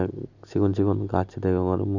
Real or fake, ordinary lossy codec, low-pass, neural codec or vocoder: real; none; 7.2 kHz; none